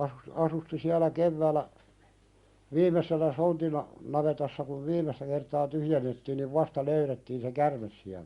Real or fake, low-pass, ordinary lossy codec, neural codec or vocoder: real; 10.8 kHz; Opus, 64 kbps; none